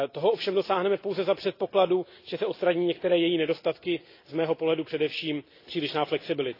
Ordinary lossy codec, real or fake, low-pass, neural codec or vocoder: AAC, 32 kbps; real; 5.4 kHz; none